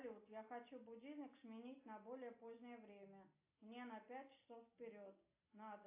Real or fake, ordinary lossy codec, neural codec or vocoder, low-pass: real; AAC, 24 kbps; none; 3.6 kHz